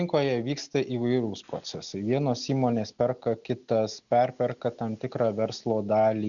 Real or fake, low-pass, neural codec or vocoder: real; 7.2 kHz; none